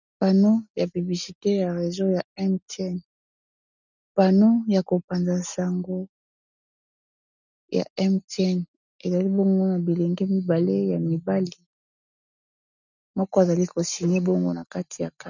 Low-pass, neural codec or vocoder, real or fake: 7.2 kHz; none; real